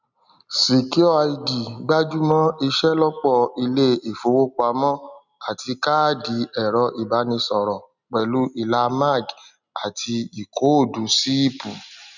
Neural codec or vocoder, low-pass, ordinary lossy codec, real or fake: none; 7.2 kHz; none; real